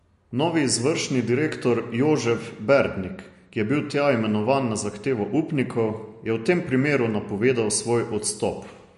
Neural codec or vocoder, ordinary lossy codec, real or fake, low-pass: none; MP3, 48 kbps; real; 14.4 kHz